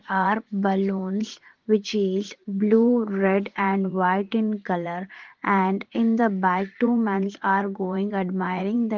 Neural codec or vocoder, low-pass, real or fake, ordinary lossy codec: vocoder, 44.1 kHz, 80 mel bands, Vocos; 7.2 kHz; fake; Opus, 16 kbps